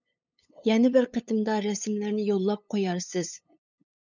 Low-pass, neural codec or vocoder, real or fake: 7.2 kHz; codec, 16 kHz, 8 kbps, FunCodec, trained on LibriTTS, 25 frames a second; fake